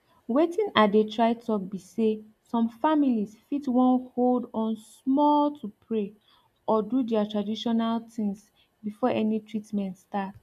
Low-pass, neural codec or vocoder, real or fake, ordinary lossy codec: 14.4 kHz; none; real; none